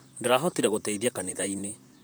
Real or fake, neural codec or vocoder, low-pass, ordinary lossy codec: fake; vocoder, 44.1 kHz, 128 mel bands, Pupu-Vocoder; none; none